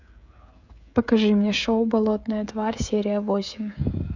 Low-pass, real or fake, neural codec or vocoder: 7.2 kHz; fake; codec, 16 kHz, 16 kbps, FreqCodec, smaller model